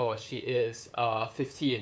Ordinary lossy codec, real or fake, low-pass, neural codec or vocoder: none; fake; none; codec, 16 kHz, 8 kbps, FunCodec, trained on LibriTTS, 25 frames a second